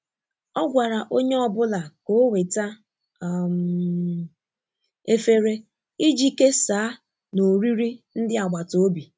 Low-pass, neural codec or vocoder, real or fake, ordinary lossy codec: none; none; real; none